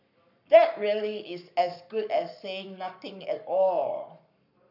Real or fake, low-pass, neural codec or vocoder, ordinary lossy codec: fake; 5.4 kHz; codec, 44.1 kHz, 7.8 kbps, Pupu-Codec; none